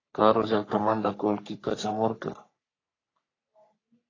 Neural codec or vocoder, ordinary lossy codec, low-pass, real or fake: codec, 44.1 kHz, 3.4 kbps, Pupu-Codec; AAC, 32 kbps; 7.2 kHz; fake